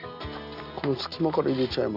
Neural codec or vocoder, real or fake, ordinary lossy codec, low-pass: none; real; none; 5.4 kHz